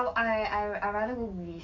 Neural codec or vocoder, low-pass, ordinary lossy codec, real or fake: codec, 44.1 kHz, 7.8 kbps, DAC; 7.2 kHz; none; fake